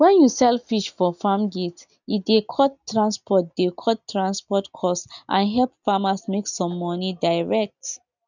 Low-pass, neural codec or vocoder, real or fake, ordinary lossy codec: 7.2 kHz; none; real; none